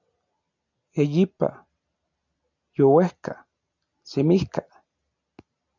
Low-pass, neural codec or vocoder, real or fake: 7.2 kHz; none; real